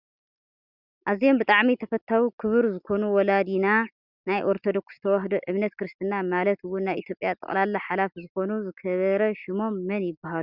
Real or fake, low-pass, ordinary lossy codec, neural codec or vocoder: real; 5.4 kHz; Opus, 64 kbps; none